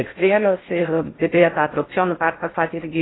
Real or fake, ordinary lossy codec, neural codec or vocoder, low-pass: fake; AAC, 16 kbps; codec, 16 kHz in and 24 kHz out, 0.6 kbps, FocalCodec, streaming, 4096 codes; 7.2 kHz